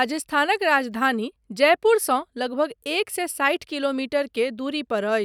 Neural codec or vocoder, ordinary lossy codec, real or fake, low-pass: none; none; real; 19.8 kHz